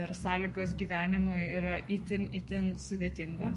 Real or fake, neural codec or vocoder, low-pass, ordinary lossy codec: fake; codec, 32 kHz, 1.9 kbps, SNAC; 14.4 kHz; MP3, 48 kbps